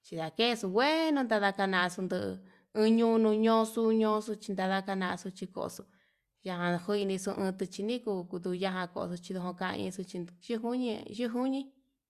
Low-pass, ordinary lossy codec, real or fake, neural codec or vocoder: 14.4 kHz; Opus, 64 kbps; real; none